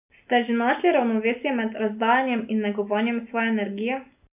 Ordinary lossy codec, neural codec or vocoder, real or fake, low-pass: none; none; real; 3.6 kHz